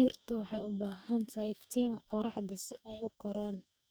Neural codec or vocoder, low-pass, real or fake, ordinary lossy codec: codec, 44.1 kHz, 2.6 kbps, DAC; none; fake; none